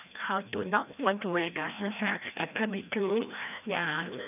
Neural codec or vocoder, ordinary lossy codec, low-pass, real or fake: codec, 16 kHz, 1 kbps, FreqCodec, larger model; none; 3.6 kHz; fake